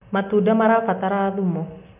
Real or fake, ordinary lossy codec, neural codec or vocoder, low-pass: real; none; none; 3.6 kHz